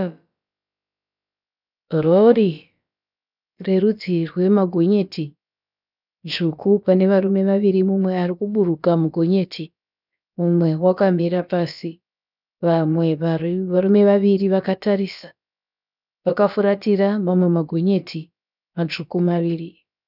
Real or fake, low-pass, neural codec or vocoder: fake; 5.4 kHz; codec, 16 kHz, about 1 kbps, DyCAST, with the encoder's durations